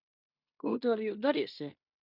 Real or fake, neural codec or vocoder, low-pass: fake; codec, 16 kHz in and 24 kHz out, 0.9 kbps, LongCat-Audio-Codec, fine tuned four codebook decoder; 5.4 kHz